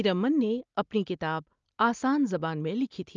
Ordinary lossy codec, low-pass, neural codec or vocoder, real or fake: Opus, 24 kbps; 7.2 kHz; none; real